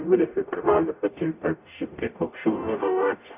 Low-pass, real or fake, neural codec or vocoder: 3.6 kHz; fake; codec, 44.1 kHz, 0.9 kbps, DAC